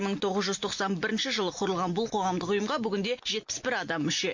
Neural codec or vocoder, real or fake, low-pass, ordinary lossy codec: none; real; 7.2 kHz; MP3, 48 kbps